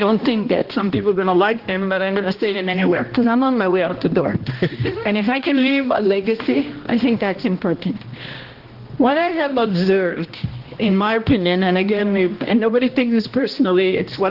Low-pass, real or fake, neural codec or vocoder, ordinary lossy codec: 5.4 kHz; fake; codec, 16 kHz, 1 kbps, X-Codec, HuBERT features, trained on balanced general audio; Opus, 16 kbps